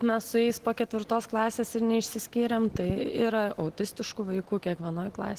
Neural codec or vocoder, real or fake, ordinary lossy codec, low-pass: none; real; Opus, 16 kbps; 14.4 kHz